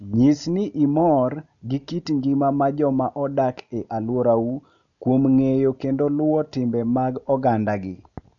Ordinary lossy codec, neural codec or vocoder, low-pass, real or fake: none; none; 7.2 kHz; real